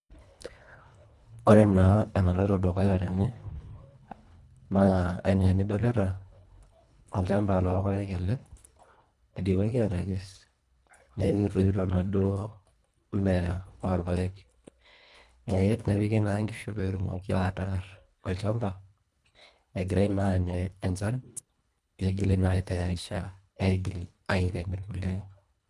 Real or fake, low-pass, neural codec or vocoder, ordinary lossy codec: fake; none; codec, 24 kHz, 1.5 kbps, HILCodec; none